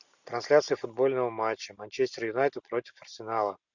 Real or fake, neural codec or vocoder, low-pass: real; none; 7.2 kHz